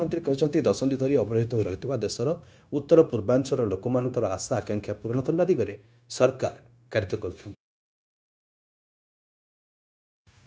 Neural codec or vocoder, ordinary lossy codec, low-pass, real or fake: codec, 16 kHz, 0.9 kbps, LongCat-Audio-Codec; none; none; fake